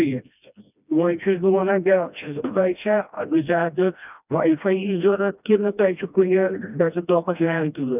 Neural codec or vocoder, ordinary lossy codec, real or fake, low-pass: codec, 16 kHz, 1 kbps, FreqCodec, smaller model; none; fake; 3.6 kHz